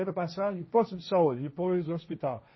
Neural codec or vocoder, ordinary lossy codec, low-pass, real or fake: codec, 16 kHz, 1.1 kbps, Voila-Tokenizer; MP3, 24 kbps; 7.2 kHz; fake